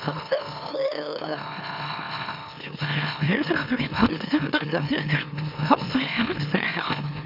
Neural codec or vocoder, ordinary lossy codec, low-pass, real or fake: autoencoder, 44.1 kHz, a latent of 192 numbers a frame, MeloTTS; none; 5.4 kHz; fake